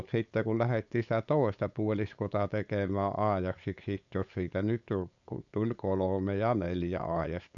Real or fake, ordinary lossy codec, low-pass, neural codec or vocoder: fake; none; 7.2 kHz; codec, 16 kHz, 4.8 kbps, FACodec